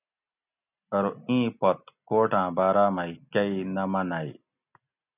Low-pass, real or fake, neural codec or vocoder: 3.6 kHz; real; none